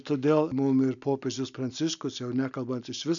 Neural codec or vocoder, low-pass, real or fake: none; 7.2 kHz; real